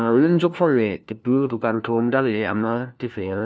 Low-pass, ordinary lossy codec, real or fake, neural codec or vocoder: none; none; fake; codec, 16 kHz, 1 kbps, FunCodec, trained on LibriTTS, 50 frames a second